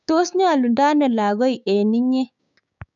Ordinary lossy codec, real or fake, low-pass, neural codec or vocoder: none; fake; 7.2 kHz; codec, 16 kHz, 6 kbps, DAC